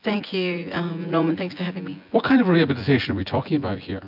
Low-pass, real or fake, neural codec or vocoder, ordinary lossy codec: 5.4 kHz; fake; vocoder, 24 kHz, 100 mel bands, Vocos; MP3, 48 kbps